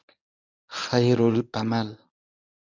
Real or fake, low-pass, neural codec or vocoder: fake; 7.2 kHz; codec, 24 kHz, 0.9 kbps, WavTokenizer, medium speech release version 1